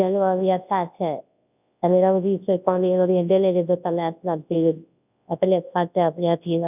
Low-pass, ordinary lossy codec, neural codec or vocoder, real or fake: 3.6 kHz; none; codec, 24 kHz, 0.9 kbps, WavTokenizer, large speech release; fake